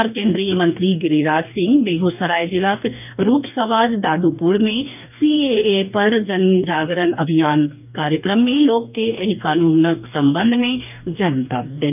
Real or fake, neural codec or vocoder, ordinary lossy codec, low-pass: fake; codec, 44.1 kHz, 2.6 kbps, DAC; none; 3.6 kHz